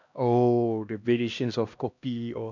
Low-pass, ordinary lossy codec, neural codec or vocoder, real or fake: 7.2 kHz; none; codec, 16 kHz, 1 kbps, X-Codec, HuBERT features, trained on LibriSpeech; fake